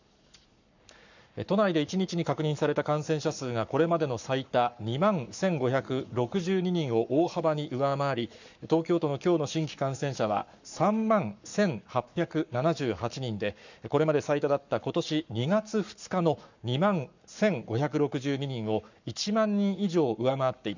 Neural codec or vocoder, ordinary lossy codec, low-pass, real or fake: codec, 44.1 kHz, 7.8 kbps, Pupu-Codec; none; 7.2 kHz; fake